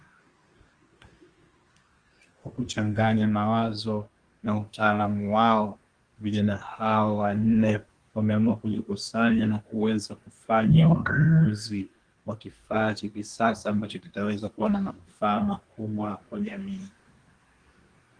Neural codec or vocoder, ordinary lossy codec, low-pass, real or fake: codec, 24 kHz, 1 kbps, SNAC; Opus, 24 kbps; 9.9 kHz; fake